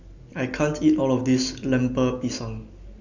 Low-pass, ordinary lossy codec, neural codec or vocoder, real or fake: 7.2 kHz; Opus, 64 kbps; none; real